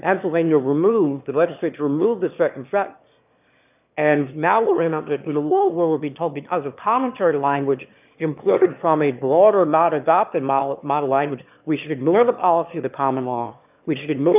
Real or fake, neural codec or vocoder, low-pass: fake; autoencoder, 22.05 kHz, a latent of 192 numbers a frame, VITS, trained on one speaker; 3.6 kHz